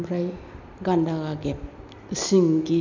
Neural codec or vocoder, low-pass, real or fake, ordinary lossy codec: none; 7.2 kHz; real; none